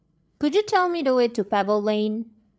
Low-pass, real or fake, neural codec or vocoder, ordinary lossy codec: none; fake; codec, 16 kHz, 8 kbps, FreqCodec, larger model; none